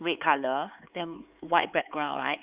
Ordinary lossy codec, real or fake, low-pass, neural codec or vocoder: Opus, 64 kbps; fake; 3.6 kHz; codec, 16 kHz, 4 kbps, X-Codec, HuBERT features, trained on LibriSpeech